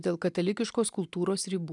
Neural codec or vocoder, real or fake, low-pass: none; real; 10.8 kHz